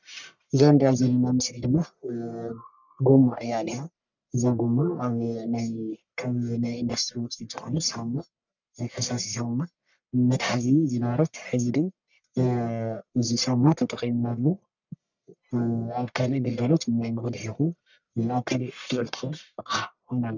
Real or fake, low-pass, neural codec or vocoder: fake; 7.2 kHz; codec, 44.1 kHz, 1.7 kbps, Pupu-Codec